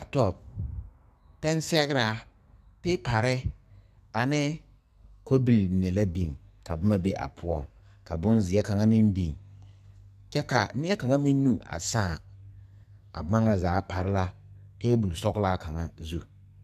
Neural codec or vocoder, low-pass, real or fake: codec, 44.1 kHz, 2.6 kbps, SNAC; 14.4 kHz; fake